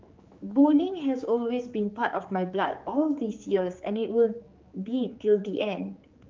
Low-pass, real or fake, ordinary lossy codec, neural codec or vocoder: 7.2 kHz; fake; Opus, 32 kbps; codec, 16 kHz, 4 kbps, X-Codec, HuBERT features, trained on general audio